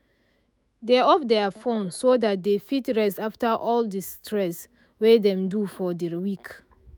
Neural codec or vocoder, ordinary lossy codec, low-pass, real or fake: autoencoder, 48 kHz, 128 numbers a frame, DAC-VAE, trained on Japanese speech; none; none; fake